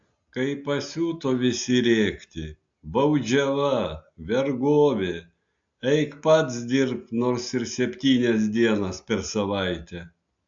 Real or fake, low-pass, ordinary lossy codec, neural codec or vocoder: real; 7.2 kHz; AAC, 64 kbps; none